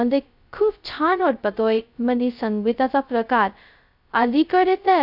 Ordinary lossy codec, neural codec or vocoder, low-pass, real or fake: none; codec, 16 kHz, 0.2 kbps, FocalCodec; 5.4 kHz; fake